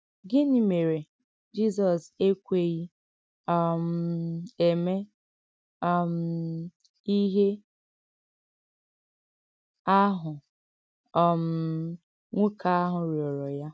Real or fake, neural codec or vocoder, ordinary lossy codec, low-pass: real; none; none; none